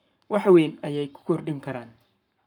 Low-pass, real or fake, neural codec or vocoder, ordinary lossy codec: 19.8 kHz; fake; codec, 44.1 kHz, 7.8 kbps, Pupu-Codec; none